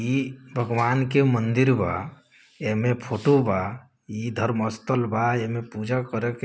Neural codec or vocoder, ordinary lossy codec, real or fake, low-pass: none; none; real; none